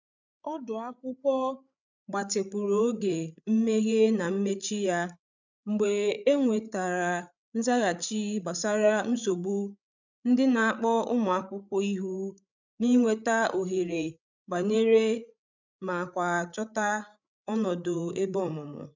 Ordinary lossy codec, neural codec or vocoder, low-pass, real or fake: none; codec, 16 kHz, 8 kbps, FreqCodec, larger model; 7.2 kHz; fake